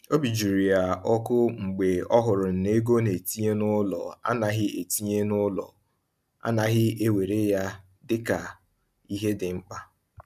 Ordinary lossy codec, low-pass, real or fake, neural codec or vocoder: none; 14.4 kHz; real; none